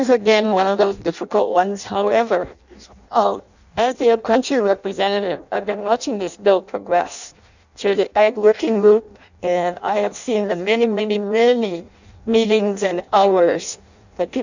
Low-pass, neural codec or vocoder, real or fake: 7.2 kHz; codec, 16 kHz in and 24 kHz out, 0.6 kbps, FireRedTTS-2 codec; fake